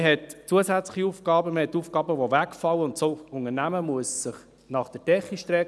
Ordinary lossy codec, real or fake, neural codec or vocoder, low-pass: none; real; none; none